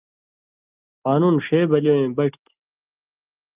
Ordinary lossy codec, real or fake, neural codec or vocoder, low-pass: Opus, 24 kbps; real; none; 3.6 kHz